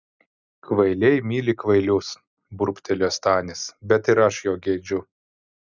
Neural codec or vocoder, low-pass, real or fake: none; 7.2 kHz; real